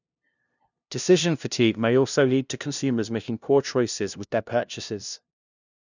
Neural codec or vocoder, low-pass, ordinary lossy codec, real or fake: codec, 16 kHz, 0.5 kbps, FunCodec, trained on LibriTTS, 25 frames a second; 7.2 kHz; none; fake